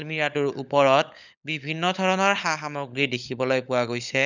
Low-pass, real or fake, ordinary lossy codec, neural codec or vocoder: 7.2 kHz; fake; none; codec, 16 kHz, 16 kbps, FunCodec, trained on LibriTTS, 50 frames a second